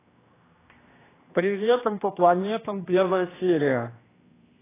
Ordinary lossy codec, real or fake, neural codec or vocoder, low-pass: AAC, 16 kbps; fake; codec, 16 kHz, 1 kbps, X-Codec, HuBERT features, trained on general audio; 3.6 kHz